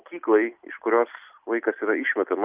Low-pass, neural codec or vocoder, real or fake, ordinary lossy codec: 3.6 kHz; none; real; Opus, 24 kbps